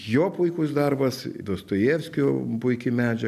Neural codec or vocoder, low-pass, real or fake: none; 14.4 kHz; real